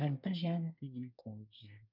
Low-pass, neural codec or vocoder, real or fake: 5.4 kHz; codec, 24 kHz, 1 kbps, SNAC; fake